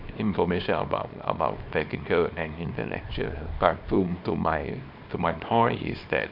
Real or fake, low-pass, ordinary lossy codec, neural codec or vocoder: fake; 5.4 kHz; none; codec, 24 kHz, 0.9 kbps, WavTokenizer, small release